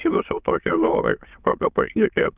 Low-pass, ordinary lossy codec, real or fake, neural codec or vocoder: 3.6 kHz; Opus, 24 kbps; fake; autoencoder, 22.05 kHz, a latent of 192 numbers a frame, VITS, trained on many speakers